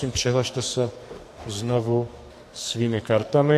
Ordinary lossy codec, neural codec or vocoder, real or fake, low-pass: AAC, 64 kbps; codec, 44.1 kHz, 2.6 kbps, SNAC; fake; 14.4 kHz